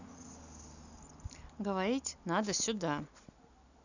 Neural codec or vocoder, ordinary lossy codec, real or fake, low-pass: none; none; real; 7.2 kHz